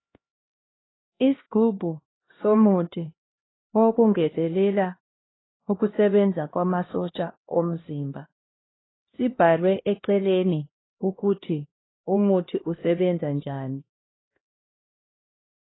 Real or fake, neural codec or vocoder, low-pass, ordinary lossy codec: fake; codec, 16 kHz, 1 kbps, X-Codec, HuBERT features, trained on LibriSpeech; 7.2 kHz; AAC, 16 kbps